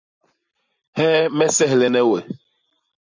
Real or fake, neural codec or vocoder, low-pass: real; none; 7.2 kHz